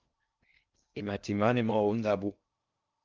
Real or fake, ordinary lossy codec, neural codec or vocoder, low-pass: fake; Opus, 32 kbps; codec, 16 kHz in and 24 kHz out, 0.6 kbps, FocalCodec, streaming, 2048 codes; 7.2 kHz